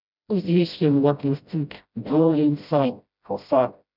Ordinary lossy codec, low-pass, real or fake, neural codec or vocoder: none; 5.4 kHz; fake; codec, 16 kHz, 0.5 kbps, FreqCodec, smaller model